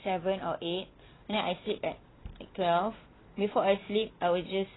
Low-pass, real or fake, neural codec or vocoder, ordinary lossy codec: 7.2 kHz; real; none; AAC, 16 kbps